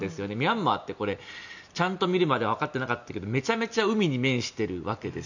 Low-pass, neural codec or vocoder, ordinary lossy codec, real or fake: 7.2 kHz; none; none; real